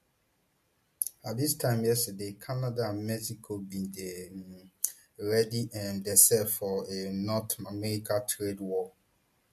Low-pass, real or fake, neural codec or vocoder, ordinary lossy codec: 14.4 kHz; real; none; MP3, 64 kbps